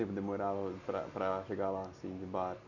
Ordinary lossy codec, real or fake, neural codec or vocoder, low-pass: none; real; none; 7.2 kHz